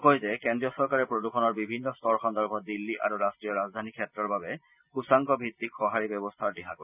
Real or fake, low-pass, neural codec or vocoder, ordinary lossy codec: real; 3.6 kHz; none; none